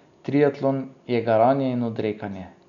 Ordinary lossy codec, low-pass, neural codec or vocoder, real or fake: none; 7.2 kHz; none; real